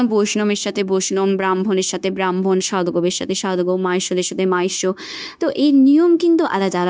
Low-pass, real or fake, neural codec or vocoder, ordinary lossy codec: none; fake; codec, 16 kHz, 0.9 kbps, LongCat-Audio-Codec; none